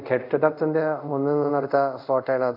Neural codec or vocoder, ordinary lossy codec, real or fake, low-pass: codec, 24 kHz, 0.5 kbps, DualCodec; none; fake; 5.4 kHz